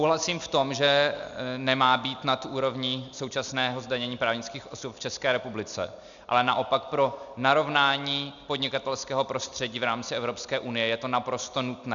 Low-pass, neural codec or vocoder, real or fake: 7.2 kHz; none; real